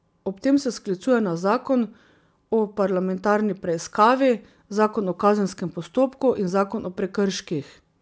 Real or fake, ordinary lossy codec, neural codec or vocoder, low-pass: real; none; none; none